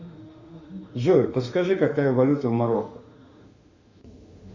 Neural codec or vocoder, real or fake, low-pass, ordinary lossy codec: autoencoder, 48 kHz, 32 numbers a frame, DAC-VAE, trained on Japanese speech; fake; 7.2 kHz; Opus, 64 kbps